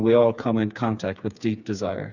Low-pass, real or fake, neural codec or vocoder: 7.2 kHz; fake; codec, 16 kHz, 4 kbps, FreqCodec, smaller model